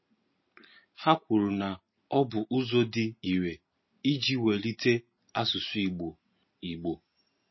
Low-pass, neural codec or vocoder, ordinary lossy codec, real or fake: 7.2 kHz; none; MP3, 24 kbps; real